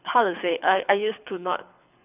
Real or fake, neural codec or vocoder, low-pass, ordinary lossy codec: fake; codec, 24 kHz, 6 kbps, HILCodec; 3.6 kHz; none